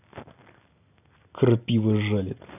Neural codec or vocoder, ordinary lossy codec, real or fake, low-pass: none; none; real; 3.6 kHz